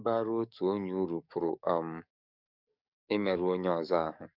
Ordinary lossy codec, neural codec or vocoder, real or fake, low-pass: none; codec, 44.1 kHz, 7.8 kbps, DAC; fake; 5.4 kHz